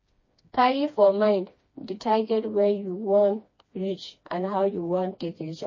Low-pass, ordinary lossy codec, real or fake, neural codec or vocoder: 7.2 kHz; MP3, 32 kbps; fake; codec, 16 kHz, 2 kbps, FreqCodec, smaller model